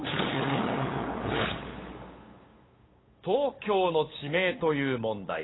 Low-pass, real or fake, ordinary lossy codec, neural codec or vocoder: 7.2 kHz; fake; AAC, 16 kbps; codec, 16 kHz, 8 kbps, FunCodec, trained on LibriTTS, 25 frames a second